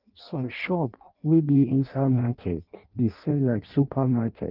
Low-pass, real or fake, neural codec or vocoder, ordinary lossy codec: 5.4 kHz; fake; codec, 16 kHz in and 24 kHz out, 0.6 kbps, FireRedTTS-2 codec; Opus, 32 kbps